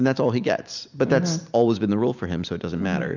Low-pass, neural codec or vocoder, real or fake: 7.2 kHz; none; real